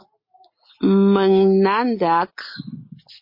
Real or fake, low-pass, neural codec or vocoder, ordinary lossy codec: real; 5.4 kHz; none; MP3, 32 kbps